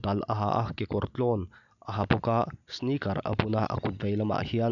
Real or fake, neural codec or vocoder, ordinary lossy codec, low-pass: real; none; none; 7.2 kHz